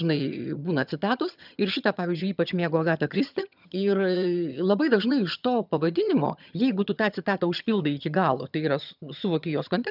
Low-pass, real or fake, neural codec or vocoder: 5.4 kHz; fake; vocoder, 22.05 kHz, 80 mel bands, HiFi-GAN